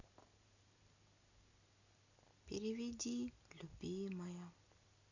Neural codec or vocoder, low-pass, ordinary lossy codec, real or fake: none; 7.2 kHz; none; real